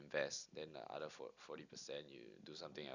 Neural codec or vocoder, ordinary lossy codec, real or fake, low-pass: none; none; real; 7.2 kHz